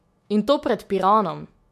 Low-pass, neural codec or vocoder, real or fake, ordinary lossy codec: 14.4 kHz; autoencoder, 48 kHz, 128 numbers a frame, DAC-VAE, trained on Japanese speech; fake; MP3, 64 kbps